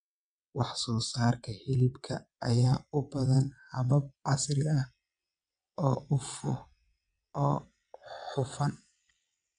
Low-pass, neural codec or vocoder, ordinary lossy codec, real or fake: 9.9 kHz; vocoder, 22.05 kHz, 80 mel bands, WaveNeXt; none; fake